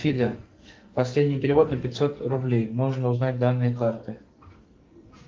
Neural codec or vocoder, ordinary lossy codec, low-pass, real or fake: codec, 44.1 kHz, 2.6 kbps, SNAC; Opus, 24 kbps; 7.2 kHz; fake